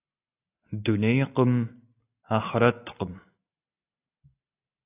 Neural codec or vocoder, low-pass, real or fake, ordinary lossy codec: none; 3.6 kHz; real; AAC, 24 kbps